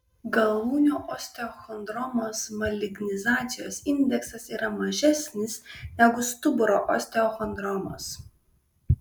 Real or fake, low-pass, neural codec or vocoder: real; 19.8 kHz; none